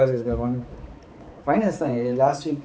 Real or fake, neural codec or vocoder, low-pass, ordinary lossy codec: fake; codec, 16 kHz, 4 kbps, X-Codec, HuBERT features, trained on balanced general audio; none; none